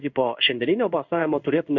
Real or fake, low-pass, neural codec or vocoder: fake; 7.2 kHz; codec, 16 kHz in and 24 kHz out, 1 kbps, XY-Tokenizer